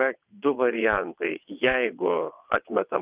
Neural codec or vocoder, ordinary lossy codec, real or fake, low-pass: vocoder, 22.05 kHz, 80 mel bands, WaveNeXt; Opus, 24 kbps; fake; 3.6 kHz